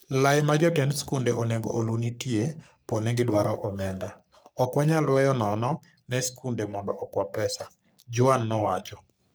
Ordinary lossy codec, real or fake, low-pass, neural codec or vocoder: none; fake; none; codec, 44.1 kHz, 3.4 kbps, Pupu-Codec